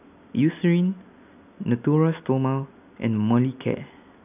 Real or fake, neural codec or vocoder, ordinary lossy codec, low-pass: real; none; none; 3.6 kHz